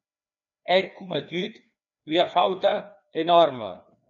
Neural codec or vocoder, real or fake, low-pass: codec, 16 kHz, 2 kbps, FreqCodec, larger model; fake; 7.2 kHz